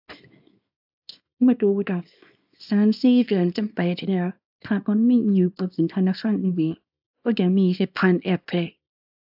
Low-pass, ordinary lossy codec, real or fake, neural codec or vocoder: 5.4 kHz; AAC, 48 kbps; fake; codec, 24 kHz, 0.9 kbps, WavTokenizer, small release